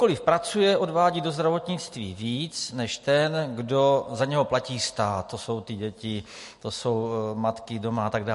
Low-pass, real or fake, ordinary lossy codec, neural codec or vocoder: 14.4 kHz; real; MP3, 48 kbps; none